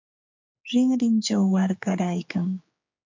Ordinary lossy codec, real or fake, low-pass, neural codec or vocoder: MP3, 48 kbps; fake; 7.2 kHz; codec, 16 kHz, 4 kbps, X-Codec, HuBERT features, trained on general audio